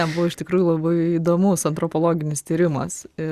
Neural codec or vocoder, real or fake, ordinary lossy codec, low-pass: none; real; Opus, 64 kbps; 14.4 kHz